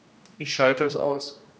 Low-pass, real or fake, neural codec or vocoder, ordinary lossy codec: none; fake; codec, 16 kHz, 1 kbps, X-Codec, HuBERT features, trained on general audio; none